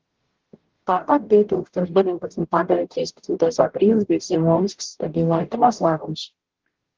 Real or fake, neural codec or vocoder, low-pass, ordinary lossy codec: fake; codec, 44.1 kHz, 0.9 kbps, DAC; 7.2 kHz; Opus, 16 kbps